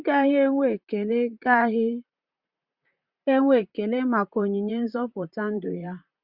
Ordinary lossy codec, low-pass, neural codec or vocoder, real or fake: Opus, 64 kbps; 5.4 kHz; vocoder, 44.1 kHz, 128 mel bands, Pupu-Vocoder; fake